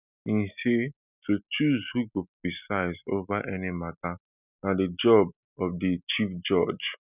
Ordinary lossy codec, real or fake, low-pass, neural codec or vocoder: none; real; 3.6 kHz; none